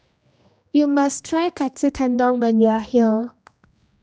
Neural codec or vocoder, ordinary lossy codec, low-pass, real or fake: codec, 16 kHz, 1 kbps, X-Codec, HuBERT features, trained on general audio; none; none; fake